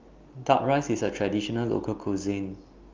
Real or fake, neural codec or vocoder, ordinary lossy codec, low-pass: real; none; Opus, 32 kbps; 7.2 kHz